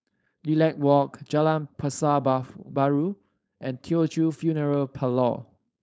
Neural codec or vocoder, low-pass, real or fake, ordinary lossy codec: codec, 16 kHz, 4.8 kbps, FACodec; none; fake; none